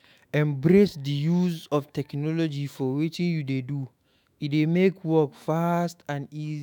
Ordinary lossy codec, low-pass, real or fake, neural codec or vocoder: none; 19.8 kHz; fake; autoencoder, 48 kHz, 128 numbers a frame, DAC-VAE, trained on Japanese speech